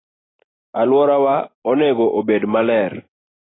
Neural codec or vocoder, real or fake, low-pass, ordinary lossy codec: none; real; 7.2 kHz; AAC, 16 kbps